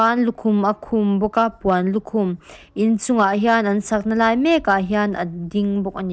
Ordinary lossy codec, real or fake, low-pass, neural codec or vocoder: none; real; none; none